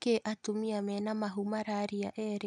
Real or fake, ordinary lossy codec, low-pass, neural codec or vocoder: real; none; 10.8 kHz; none